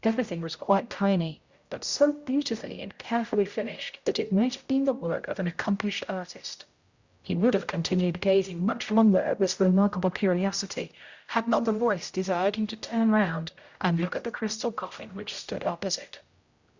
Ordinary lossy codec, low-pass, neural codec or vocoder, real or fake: Opus, 64 kbps; 7.2 kHz; codec, 16 kHz, 0.5 kbps, X-Codec, HuBERT features, trained on general audio; fake